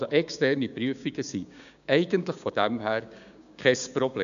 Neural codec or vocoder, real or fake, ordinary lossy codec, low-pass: codec, 16 kHz, 6 kbps, DAC; fake; none; 7.2 kHz